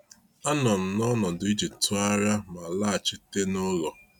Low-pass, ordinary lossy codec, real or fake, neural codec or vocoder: 19.8 kHz; none; real; none